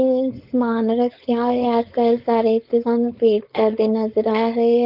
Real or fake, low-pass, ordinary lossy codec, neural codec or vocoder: fake; 5.4 kHz; Opus, 32 kbps; codec, 16 kHz, 4.8 kbps, FACodec